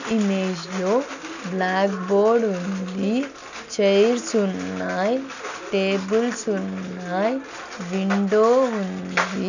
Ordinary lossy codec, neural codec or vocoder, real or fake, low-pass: none; none; real; 7.2 kHz